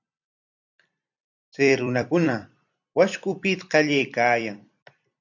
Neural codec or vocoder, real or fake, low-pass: vocoder, 44.1 kHz, 128 mel bands every 256 samples, BigVGAN v2; fake; 7.2 kHz